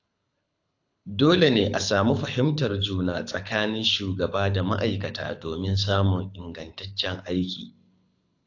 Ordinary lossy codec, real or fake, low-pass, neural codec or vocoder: AAC, 48 kbps; fake; 7.2 kHz; codec, 24 kHz, 6 kbps, HILCodec